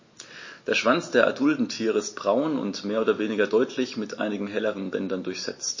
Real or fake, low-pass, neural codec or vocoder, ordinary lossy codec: real; 7.2 kHz; none; MP3, 32 kbps